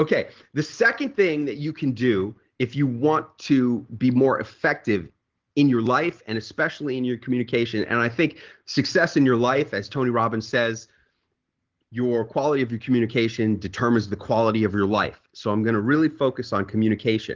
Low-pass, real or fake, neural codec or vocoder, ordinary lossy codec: 7.2 kHz; real; none; Opus, 16 kbps